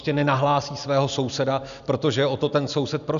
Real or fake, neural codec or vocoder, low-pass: real; none; 7.2 kHz